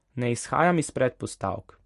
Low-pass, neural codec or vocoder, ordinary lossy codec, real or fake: 14.4 kHz; none; MP3, 48 kbps; real